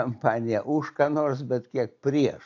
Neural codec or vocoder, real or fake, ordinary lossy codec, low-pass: none; real; AAC, 48 kbps; 7.2 kHz